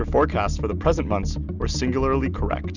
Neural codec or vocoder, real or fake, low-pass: none; real; 7.2 kHz